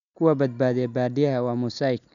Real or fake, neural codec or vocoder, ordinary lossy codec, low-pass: real; none; none; 7.2 kHz